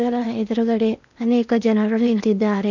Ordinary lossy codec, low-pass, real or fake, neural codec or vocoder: none; 7.2 kHz; fake; codec, 16 kHz in and 24 kHz out, 0.8 kbps, FocalCodec, streaming, 65536 codes